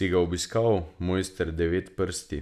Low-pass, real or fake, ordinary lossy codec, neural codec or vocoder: 14.4 kHz; real; none; none